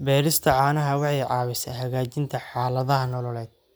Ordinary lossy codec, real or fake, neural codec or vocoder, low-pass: none; real; none; none